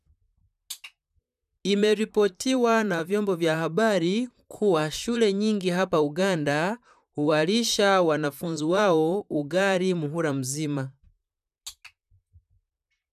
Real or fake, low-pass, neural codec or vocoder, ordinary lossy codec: fake; 14.4 kHz; vocoder, 44.1 kHz, 128 mel bands, Pupu-Vocoder; none